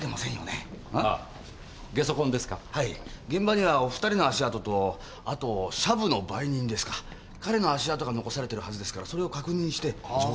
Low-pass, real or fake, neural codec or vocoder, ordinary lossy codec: none; real; none; none